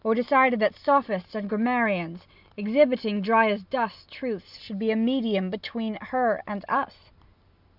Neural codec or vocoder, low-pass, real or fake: none; 5.4 kHz; real